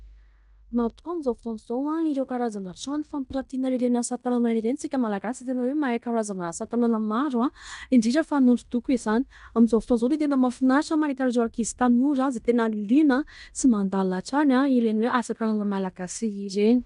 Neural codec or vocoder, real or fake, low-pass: codec, 16 kHz in and 24 kHz out, 0.9 kbps, LongCat-Audio-Codec, fine tuned four codebook decoder; fake; 10.8 kHz